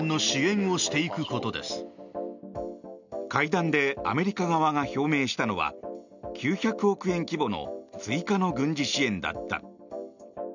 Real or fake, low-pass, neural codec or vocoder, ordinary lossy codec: real; 7.2 kHz; none; none